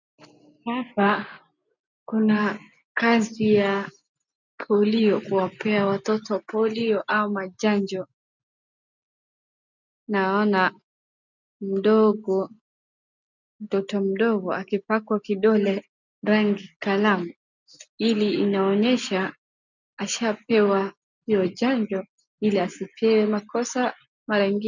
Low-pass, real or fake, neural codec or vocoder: 7.2 kHz; real; none